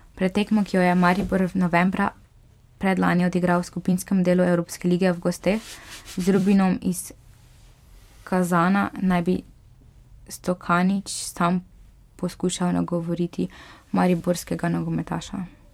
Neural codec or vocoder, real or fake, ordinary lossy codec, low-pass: vocoder, 44.1 kHz, 128 mel bands every 256 samples, BigVGAN v2; fake; MP3, 96 kbps; 19.8 kHz